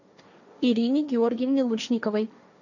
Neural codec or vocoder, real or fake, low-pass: codec, 16 kHz, 1.1 kbps, Voila-Tokenizer; fake; 7.2 kHz